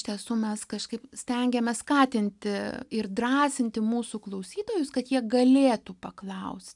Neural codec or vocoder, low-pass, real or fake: none; 10.8 kHz; real